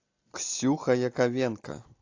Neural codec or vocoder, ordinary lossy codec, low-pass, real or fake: none; AAC, 48 kbps; 7.2 kHz; real